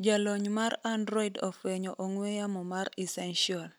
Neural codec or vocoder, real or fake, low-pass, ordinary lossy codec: none; real; none; none